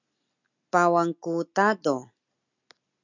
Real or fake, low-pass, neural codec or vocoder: real; 7.2 kHz; none